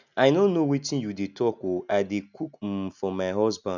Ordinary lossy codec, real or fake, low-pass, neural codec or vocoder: none; real; 7.2 kHz; none